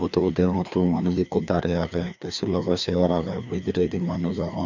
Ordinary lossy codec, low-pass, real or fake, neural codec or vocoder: none; 7.2 kHz; fake; codec, 16 kHz, 2 kbps, FreqCodec, larger model